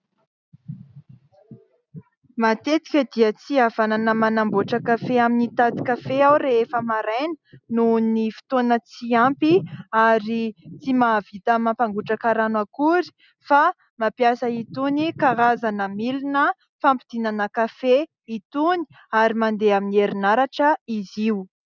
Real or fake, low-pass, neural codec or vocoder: real; 7.2 kHz; none